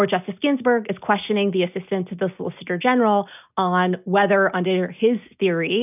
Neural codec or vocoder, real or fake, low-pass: none; real; 3.6 kHz